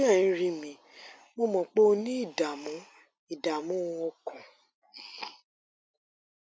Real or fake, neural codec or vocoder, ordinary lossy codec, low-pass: real; none; none; none